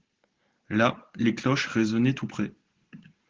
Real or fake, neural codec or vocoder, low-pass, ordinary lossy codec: real; none; 7.2 kHz; Opus, 16 kbps